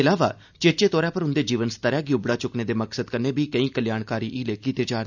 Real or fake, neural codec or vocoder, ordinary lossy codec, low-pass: real; none; none; 7.2 kHz